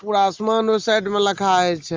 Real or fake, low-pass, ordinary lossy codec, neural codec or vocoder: real; 7.2 kHz; Opus, 32 kbps; none